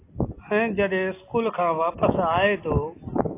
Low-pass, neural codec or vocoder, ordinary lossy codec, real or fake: 3.6 kHz; none; AAC, 24 kbps; real